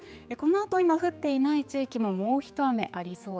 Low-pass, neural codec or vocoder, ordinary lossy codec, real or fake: none; codec, 16 kHz, 4 kbps, X-Codec, HuBERT features, trained on general audio; none; fake